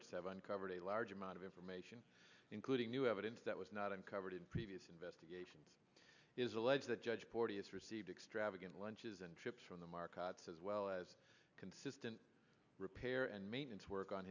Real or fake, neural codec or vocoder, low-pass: real; none; 7.2 kHz